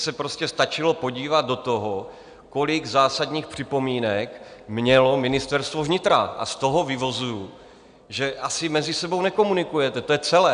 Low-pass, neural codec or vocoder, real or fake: 9.9 kHz; none; real